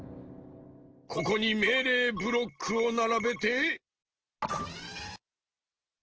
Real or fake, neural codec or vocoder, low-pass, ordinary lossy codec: real; none; 7.2 kHz; Opus, 16 kbps